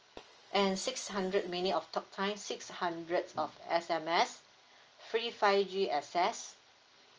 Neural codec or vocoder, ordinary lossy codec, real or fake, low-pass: none; Opus, 24 kbps; real; 7.2 kHz